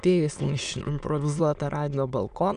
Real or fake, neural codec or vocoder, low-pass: fake; autoencoder, 22.05 kHz, a latent of 192 numbers a frame, VITS, trained on many speakers; 9.9 kHz